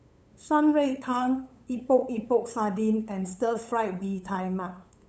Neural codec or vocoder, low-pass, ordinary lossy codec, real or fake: codec, 16 kHz, 8 kbps, FunCodec, trained on LibriTTS, 25 frames a second; none; none; fake